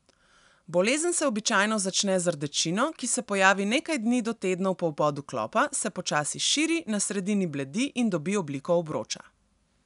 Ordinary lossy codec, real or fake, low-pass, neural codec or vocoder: none; real; 10.8 kHz; none